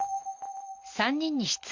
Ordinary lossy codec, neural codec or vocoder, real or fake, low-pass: Opus, 64 kbps; none; real; 7.2 kHz